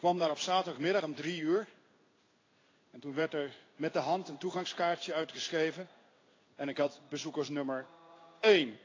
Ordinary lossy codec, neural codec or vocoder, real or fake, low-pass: AAC, 32 kbps; codec, 16 kHz in and 24 kHz out, 1 kbps, XY-Tokenizer; fake; 7.2 kHz